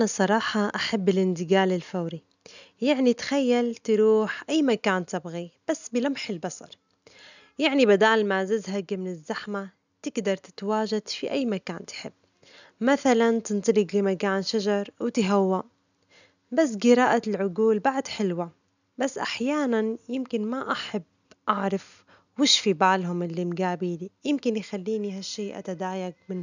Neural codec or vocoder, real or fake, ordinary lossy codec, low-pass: none; real; none; 7.2 kHz